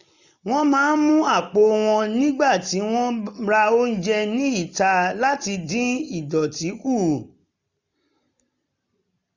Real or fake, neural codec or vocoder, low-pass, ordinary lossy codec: real; none; 7.2 kHz; none